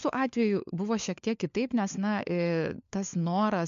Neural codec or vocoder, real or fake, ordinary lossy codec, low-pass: codec, 16 kHz, 4 kbps, FunCodec, trained on LibriTTS, 50 frames a second; fake; MP3, 64 kbps; 7.2 kHz